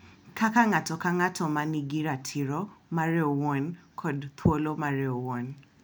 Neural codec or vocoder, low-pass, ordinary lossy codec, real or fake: none; none; none; real